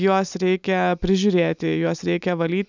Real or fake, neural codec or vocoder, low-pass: real; none; 7.2 kHz